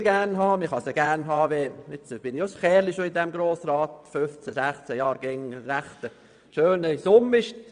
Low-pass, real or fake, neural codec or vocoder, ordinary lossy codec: 9.9 kHz; fake; vocoder, 22.05 kHz, 80 mel bands, WaveNeXt; none